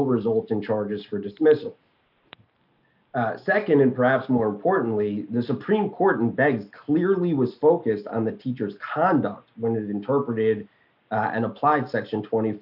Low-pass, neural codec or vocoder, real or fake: 5.4 kHz; none; real